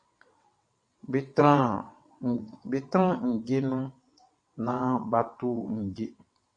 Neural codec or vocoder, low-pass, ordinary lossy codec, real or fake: vocoder, 22.05 kHz, 80 mel bands, WaveNeXt; 9.9 kHz; MP3, 48 kbps; fake